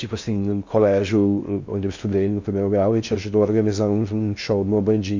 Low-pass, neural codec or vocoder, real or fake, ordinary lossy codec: 7.2 kHz; codec, 16 kHz in and 24 kHz out, 0.6 kbps, FocalCodec, streaming, 4096 codes; fake; MP3, 64 kbps